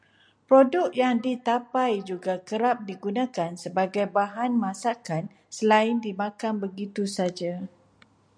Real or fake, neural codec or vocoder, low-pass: real; none; 9.9 kHz